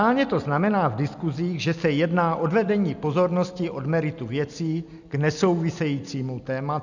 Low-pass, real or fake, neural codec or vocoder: 7.2 kHz; real; none